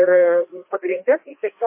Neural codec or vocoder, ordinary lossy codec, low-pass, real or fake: codec, 16 kHz, 2 kbps, FreqCodec, larger model; MP3, 24 kbps; 3.6 kHz; fake